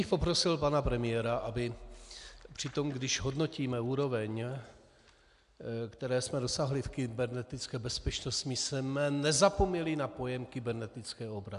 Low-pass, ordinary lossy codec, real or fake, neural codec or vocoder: 10.8 kHz; AAC, 96 kbps; real; none